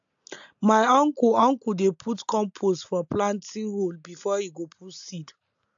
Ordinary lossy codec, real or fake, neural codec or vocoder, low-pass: AAC, 64 kbps; real; none; 7.2 kHz